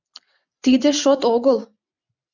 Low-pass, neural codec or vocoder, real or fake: 7.2 kHz; vocoder, 44.1 kHz, 128 mel bands every 256 samples, BigVGAN v2; fake